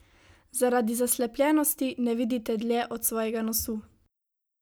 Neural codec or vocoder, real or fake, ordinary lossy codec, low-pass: none; real; none; none